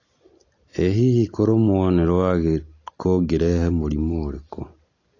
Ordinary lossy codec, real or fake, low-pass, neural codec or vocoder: AAC, 32 kbps; real; 7.2 kHz; none